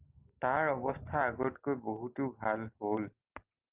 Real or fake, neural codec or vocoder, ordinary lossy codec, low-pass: real; none; Opus, 24 kbps; 3.6 kHz